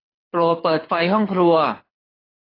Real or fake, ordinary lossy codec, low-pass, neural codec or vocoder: fake; AAC, 24 kbps; 5.4 kHz; codec, 24 kHz, 6 kbps, HILCodec